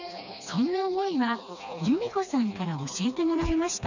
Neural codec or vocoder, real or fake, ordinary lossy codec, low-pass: codec, 16 kHz, 2 kbps, FreqCodec, smaller model; fake; none; 7.2 kHz